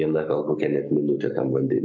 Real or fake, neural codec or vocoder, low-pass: fake; codec, 44.1 kHz, 7.8 kbps, Pupu-Codec; 7.2 kHz